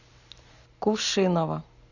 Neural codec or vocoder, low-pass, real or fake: none; 7.2 kHz; real